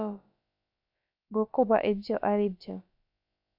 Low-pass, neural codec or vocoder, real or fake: 5.4 kHz; codec, 16 kHz, about 1 kbps, DyCAST, with the encoder's durations; fake